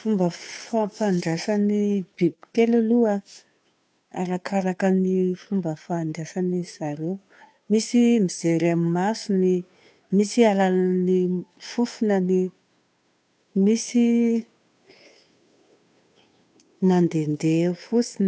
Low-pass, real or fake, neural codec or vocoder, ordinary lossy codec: none; fake; codec, 16 kHz, 2 kbps, FunCodec, trained on Chinese and English, 25 frames a second; none